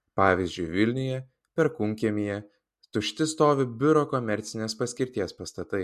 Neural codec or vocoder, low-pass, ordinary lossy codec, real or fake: none; 14.4 kHz; MP3, 64 kbps; real